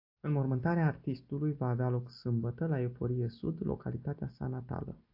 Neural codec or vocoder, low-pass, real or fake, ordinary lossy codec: none; 5.4 kHz; real; AAC, 48 kbps